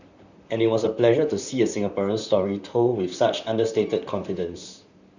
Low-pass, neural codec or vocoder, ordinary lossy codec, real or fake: 7.2 kHz; vocoder, 44.1 kHz, 128 mel bands, Pupu-Vocoder; none; fake